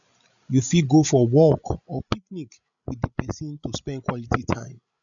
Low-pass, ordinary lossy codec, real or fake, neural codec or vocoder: 7.2 kHz; none; fake; codec, 16 kHz, 16 kbps, FreqCodec, larger model